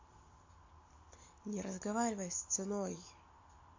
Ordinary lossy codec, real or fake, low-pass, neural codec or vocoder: MP3, 48 kbps; real; 7.2 kHz; none